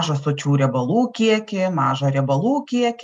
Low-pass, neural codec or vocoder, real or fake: 10.8 kHz; none; real